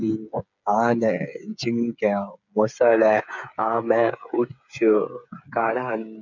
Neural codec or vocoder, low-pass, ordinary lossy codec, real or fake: codec, 16 kHz, 8 kbps, FreqCodec, smaller model; 7.2 kHz; none; fake